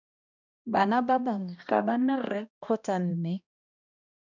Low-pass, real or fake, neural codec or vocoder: 7.2 kHz; fake; codec, 16 kHz, 1 kbps, X-Codec, HuBERT features, trained on balanced general audio